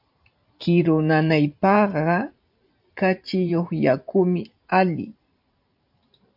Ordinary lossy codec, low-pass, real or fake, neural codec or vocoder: AAC, 48 kbps; 5.4 kHz; fake; vocoder, 44.1 kHz, 128 mel bands every 512 samples, BigVGAN v2